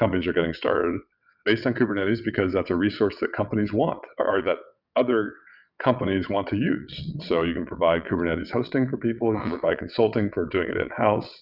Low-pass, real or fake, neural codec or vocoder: 5.4 kHz; fake; vocoder, 22.05 kHz, 80 mel bands, WaveNeXt